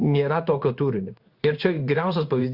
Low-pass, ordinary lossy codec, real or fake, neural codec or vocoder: 5.4 kHz; Opus, 64 kbps; fake; codec, 16 kHz in and 24 kHz out, 1 kbps, XY-Tokenizer